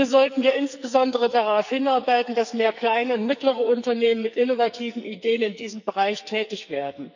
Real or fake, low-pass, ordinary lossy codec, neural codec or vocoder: fake; 7.2 kHz; none; codec, 44.1 kHz, 2.6 kbps, SNAC